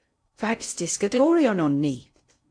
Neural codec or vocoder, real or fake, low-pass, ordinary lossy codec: codec, 16 kHz in and 24 kHz out, 0.6 kbps, FocalCodec, streaming, 2048 codes; fake; 9.9 kHz; AAC, 64 kbps